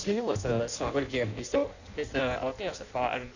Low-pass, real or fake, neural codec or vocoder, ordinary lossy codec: 7.2 kHz; fake; codec, 16 kHz in and 24 kHz out, 0.6 kbps, FireRedTTS-2 codec; none